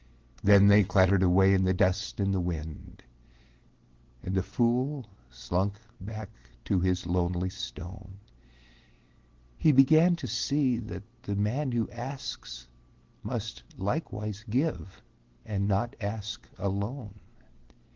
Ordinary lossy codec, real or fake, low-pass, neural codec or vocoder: Opus, 16 kbps; real; 7.2 kHz; none